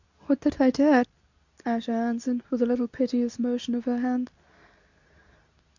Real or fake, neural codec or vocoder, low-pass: fake; codec, 24 kHz, 0.9 kbps, WavTokenizer, medium speech release version 2; 7.2 kHz